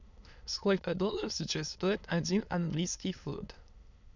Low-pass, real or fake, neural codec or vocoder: 7.2 kHz; fake; autoencoder, 22.05 kHz, a latent of 192 numbers a frame, VITS, trained on many speakers